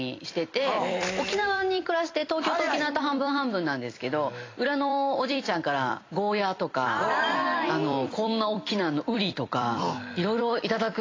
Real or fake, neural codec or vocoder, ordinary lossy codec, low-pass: fake; vocoder, 44.1 kHz, 128 mel bands every 256 samples, BigVGAN v2; AAC, 32 kbps; 7.2 kHz